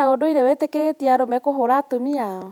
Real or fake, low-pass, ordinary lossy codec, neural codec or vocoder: fake; 19.8 kHz; none; vocoder, 48 kHz, 128 mel bands, Vocos